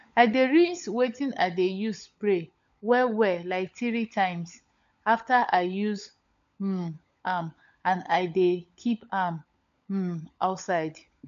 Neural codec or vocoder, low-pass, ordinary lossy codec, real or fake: codec, 16 kHz, 16 kbps, FunCodec, trained on LibriTTS, 50 frames a second; 7.2 kHz; AAC, 64 kbps; fake